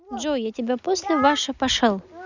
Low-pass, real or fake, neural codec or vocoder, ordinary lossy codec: 7.2 kHz; real; none; none